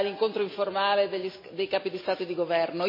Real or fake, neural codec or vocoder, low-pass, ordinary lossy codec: real; none; 5.4 kHz; MP3, 24 kbps